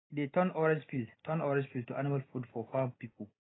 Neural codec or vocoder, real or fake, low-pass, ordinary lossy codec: none; real; 7.2 kHz; AAC, 16 kbps